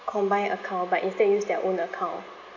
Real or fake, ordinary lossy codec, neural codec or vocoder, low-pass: real; none; none; 7.2 kHz